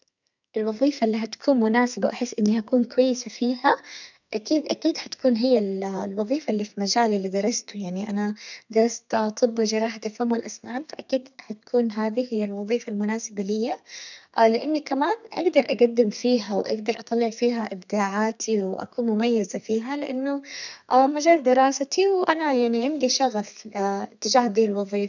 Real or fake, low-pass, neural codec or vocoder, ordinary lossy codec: fake; 7.2 kHz; codec, 32 kHz, 1.9 kbps, SNAC; none